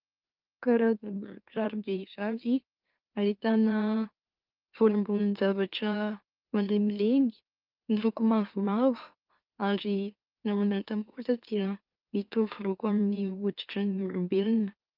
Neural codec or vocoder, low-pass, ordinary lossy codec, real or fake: autoencoder, 44.1 kHz, a latent of 192 numbers a frame, MeloTTS; 5.4 kHz; Opus, 24 kbps; fake